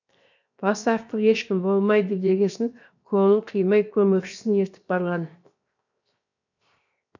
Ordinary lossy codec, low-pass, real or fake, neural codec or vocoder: none; 7.2 kHz; fake; codec, 16 kHz, 0.7 kbps, FocalCodec